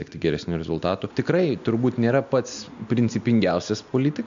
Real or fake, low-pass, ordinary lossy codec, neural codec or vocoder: real; 7.2 kHz; MP3, 64 kbps; none